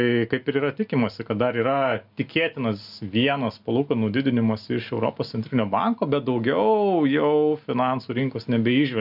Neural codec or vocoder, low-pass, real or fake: none; 5.4 kHz; real